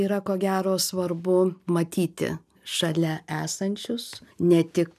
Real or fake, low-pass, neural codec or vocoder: real; 14.4 kHz; none